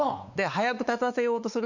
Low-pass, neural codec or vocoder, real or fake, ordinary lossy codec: 7.2 kHz; codec, 16 kHz, 4 kbps, X-Codec, WavLM features, trained on Multilingual LibriSpeech; fake; none